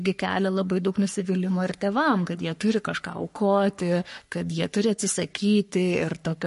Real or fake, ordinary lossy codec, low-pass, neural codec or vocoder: fake; MP3, 48 kbps; 14.4 kHz; codec, 44.1 kHz, 3.4 kbps, Pupu-Codec